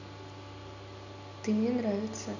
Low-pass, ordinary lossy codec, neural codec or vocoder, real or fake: 7.2 kHz; none; none; real